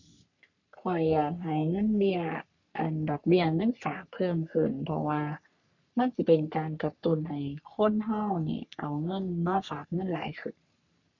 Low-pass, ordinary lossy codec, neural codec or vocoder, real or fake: 7.2 kHz; none; codec, 44.1 kHz, 3.4 kbps, Pupu-Codec; fake